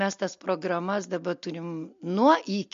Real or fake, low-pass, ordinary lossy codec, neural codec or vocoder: real; 7.2 kHz; MP3, 48 kbps; none